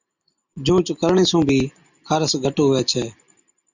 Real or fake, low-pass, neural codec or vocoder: real; 7.2 kHz; none